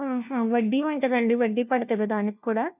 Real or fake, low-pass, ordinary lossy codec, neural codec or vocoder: fake; 3.6 kHz; none; codec, 16 kHz, 1 kbps, FunCodec, trained on LibriTTS, 50 frames a second